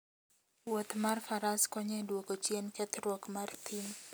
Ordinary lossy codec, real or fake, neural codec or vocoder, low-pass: none; real; none; none